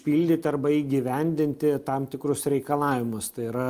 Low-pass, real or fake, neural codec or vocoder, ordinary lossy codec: 14.4 kHz; real; none; Opus, 32 kbps